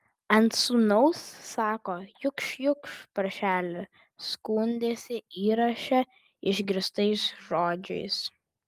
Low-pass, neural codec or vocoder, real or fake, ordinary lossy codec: 14.4 kHz; none; real; Opus, 32 kbps